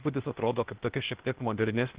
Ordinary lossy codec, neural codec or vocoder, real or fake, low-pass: Opus, 16 kbps; codec, 16 kHz, 0.8 kbps, ZipCodec; fake; 3.6 kHz